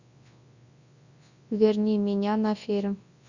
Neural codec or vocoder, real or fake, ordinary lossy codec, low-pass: codec, 24 kHz, 0.9 kbps, WavTokenizer, large speech release; fake; Opus, 64 kbps; 7.2 kHz